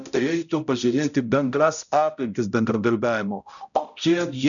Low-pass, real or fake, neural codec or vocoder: 7.2 kHz; fake; codec, 16 kHz, 0.5 kbps, X-Codec, HuBERT features, trained on balanced general audio